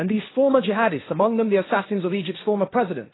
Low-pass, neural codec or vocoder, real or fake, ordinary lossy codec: 7.2 kHz; codec, 16 kHz, 1.1 kbps, Voila-Tokenizer; fake; AAC, 16 kbps